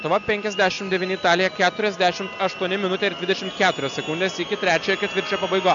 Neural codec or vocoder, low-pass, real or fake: none; 7.2 kHz; real